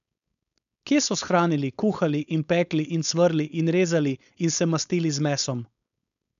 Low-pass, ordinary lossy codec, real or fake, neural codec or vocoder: 7.2 kHz; none; fake; codec, 16 kHz, 4.8 kbps, FACodec